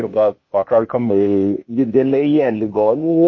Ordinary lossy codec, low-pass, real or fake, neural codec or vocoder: MP3, 32 kbps; 7.2 kHz; fake; codec, 16 kHz, 0.8 kbps, ZipCodec